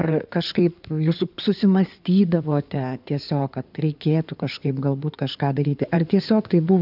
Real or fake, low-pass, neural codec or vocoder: fake; 5.4 kHz; codec, 16 kHz in and 24 kHz out, 2.2 kbps, FireRedTTS-2 codec